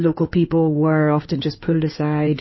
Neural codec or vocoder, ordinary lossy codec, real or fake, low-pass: codec, 16 kHz in and 24 kHz out, 2.2 kbps, FireRedTTS-2 codec; MP3, 24 kbps; fake; 7.2 kHz